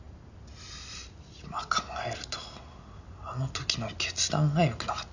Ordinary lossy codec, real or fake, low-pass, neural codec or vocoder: none; real; 7.2 kHz; none